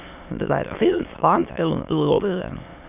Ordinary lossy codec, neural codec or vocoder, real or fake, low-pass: none; autoencoder, 22.05 kHz, a latent of 192 numbers a frame, VITS, trained on many speakers; fake; 3.6 kHz